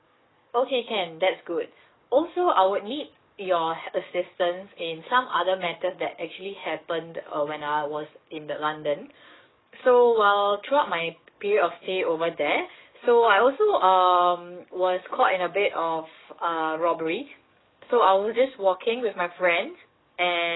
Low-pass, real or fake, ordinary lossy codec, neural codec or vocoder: 7.2 kHz; fake; AAC, 16 kbps; codec, 44.1 kHz, 7.8 kbps, DAC